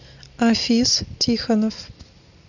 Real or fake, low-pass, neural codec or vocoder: real; 7.2 kHz; none